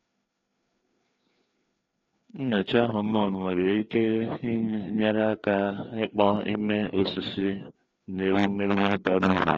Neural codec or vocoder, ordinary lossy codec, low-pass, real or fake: codec, 16 kHz, 2 kbps, FreqCodec, larger model; AAC, 32 kbps; 7.2 kHz; fake